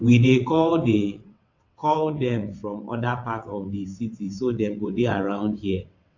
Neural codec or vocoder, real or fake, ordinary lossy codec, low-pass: vocoder, 22.05 kHz, 80 mel bands, WaveNeXt; fake; AAC, 48 kbps; 7.2 kHz